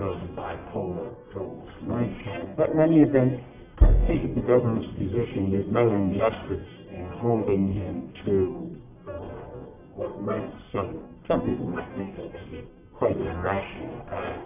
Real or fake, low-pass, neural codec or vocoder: fake; 3.6 kHz; codec, 44.1 kHz, 1.7 kbps, Pupu-Codec